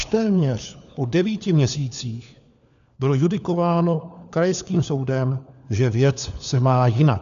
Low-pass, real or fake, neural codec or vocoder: 7.2 kHz; fake; codec, 16 kHz, 4 kbps, FunCodec, trained on LibriTTS, 50 frames a second